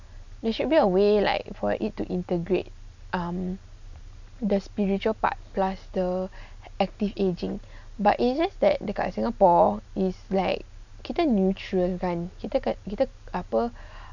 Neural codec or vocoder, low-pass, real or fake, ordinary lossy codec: none; 7.2 kHz; real; none